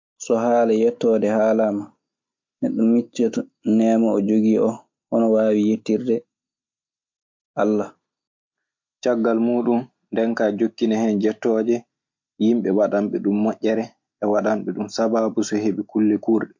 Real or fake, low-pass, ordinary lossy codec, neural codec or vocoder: real; 7.2 kHz; MP3, 48 kbps; none